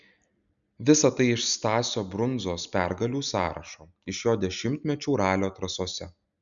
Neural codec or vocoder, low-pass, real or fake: none; 7.2 kHz; real